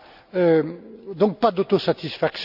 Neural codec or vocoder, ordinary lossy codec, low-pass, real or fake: none; AAC, 48 kbps; 5.4 kHz; real